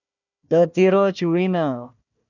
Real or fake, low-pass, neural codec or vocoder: fake; 7.2 kHz; codec, 16 kHz, 1 kbps, FunCodec, trained on Chinese and English, 50 frames a second